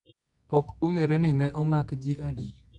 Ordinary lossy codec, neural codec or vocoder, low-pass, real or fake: none; codec, 24 kHz, 0.9 kbps, WavTokenizer, medium music audio release; 10.8 kHz; fake